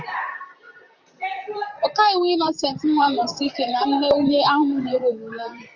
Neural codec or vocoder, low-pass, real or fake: vocoder, 44.1 kHz, 128 mel bands, Pupu-Vocoder; 7.2 kHz; fake